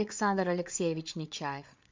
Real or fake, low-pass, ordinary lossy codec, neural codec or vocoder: fake; 7.2 kHz; MP3, 64 kbps; codec, 16 kHz, 8 kbps, FreqCodec, larger model